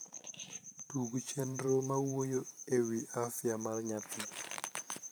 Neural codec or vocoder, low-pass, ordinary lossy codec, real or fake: vocoder, 44.1 kHz, 128 mel bands every 512 samples, BigVGAN v2; none; none; fake